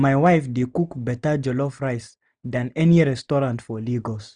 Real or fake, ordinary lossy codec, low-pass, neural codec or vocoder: real; none; 9.9 kHz; none